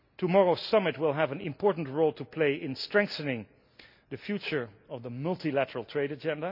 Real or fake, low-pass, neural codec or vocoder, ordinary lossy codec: real; 5.4 kHz; none; none